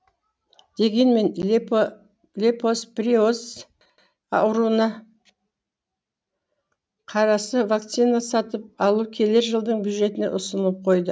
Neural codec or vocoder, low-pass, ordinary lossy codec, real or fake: none; none; none; real